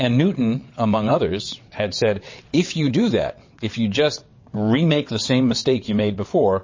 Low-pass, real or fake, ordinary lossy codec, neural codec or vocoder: 7.2 kHz; fake; MP3, 32 kbps; vocoder, 22.05 kHz, 80 mel bands, WaveNeXt